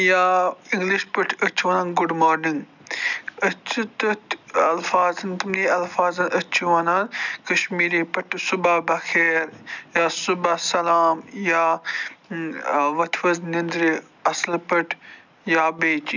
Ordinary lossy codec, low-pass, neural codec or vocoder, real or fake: none; 7.2 kHz; none; real